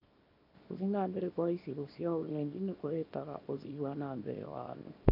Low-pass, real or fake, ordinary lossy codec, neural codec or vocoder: 5.4 kHz; fake; none; codec, 24 kHz, 0.9 kbps, WavTokenizer, small release